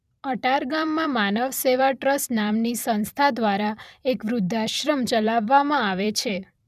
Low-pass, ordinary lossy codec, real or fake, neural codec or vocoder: 14.4 kHz; none; fake; vocoder, 44.1 kHz, 128 mel bands every 256 samples, BigVGAN v2